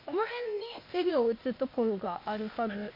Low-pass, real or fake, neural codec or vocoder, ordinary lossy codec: 5.4 kHz; fake; codec, 16 kHz, 0.8 kbps, ZipCodec; MP3, 32 kbps